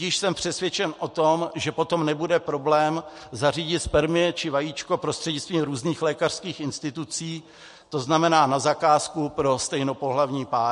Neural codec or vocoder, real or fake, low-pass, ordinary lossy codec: none; real; 14.4 kHz; MP3, 48 kbps